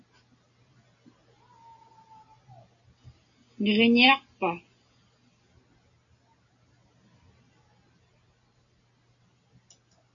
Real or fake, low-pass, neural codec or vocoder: real; 7.2 kHz; none